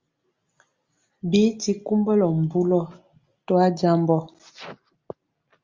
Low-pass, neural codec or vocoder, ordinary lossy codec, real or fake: 7.2 kHz; none; Opus, 32 kbps; real